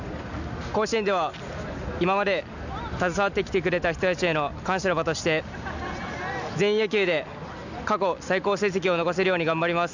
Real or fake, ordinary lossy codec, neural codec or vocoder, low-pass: real; none; none; 7.2 kHz